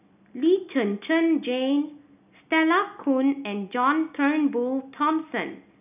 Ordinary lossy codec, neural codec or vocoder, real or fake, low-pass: none; none; real; 3.6 kHz